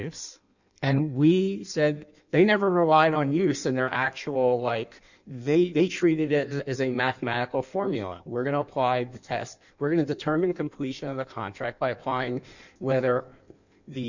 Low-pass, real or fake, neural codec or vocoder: 7.2 kHz; fake; codec, 16 kHz in and 24 kHz out, 1.1 kbps, FireRedTTS-2 codec